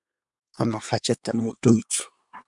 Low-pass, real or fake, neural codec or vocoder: 10.8 kHz; fake; codec, 24 kHz, 1 kbps, SNAC